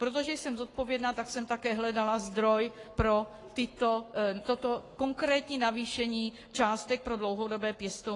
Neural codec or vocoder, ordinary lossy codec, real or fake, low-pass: codec, 44.1 kHz, 7.8 kbps, Pupu-Codec; AAC, 32 kbps; fake; 10.8 kHz